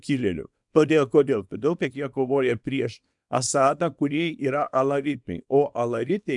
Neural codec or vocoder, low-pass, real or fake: codec, 24 kHz, 0.9 kbps, WavTokenizer, small release; 10.8 kHz; fake